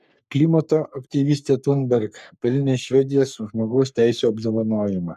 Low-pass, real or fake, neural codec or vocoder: 14.4 kHz; fake; codec, 44.1 kHz, 3.4 kbps, Pupu-Codec